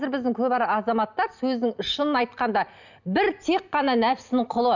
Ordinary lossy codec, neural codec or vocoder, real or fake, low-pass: none; none; real; 7.2 kHz